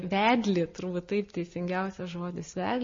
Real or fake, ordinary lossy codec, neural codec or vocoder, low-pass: real; MP3, 32 kbps; none; 7.2 kHz